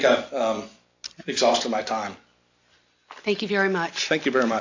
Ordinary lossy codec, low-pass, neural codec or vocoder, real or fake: AAC, 48 kbps; 7.2 kHz; none; real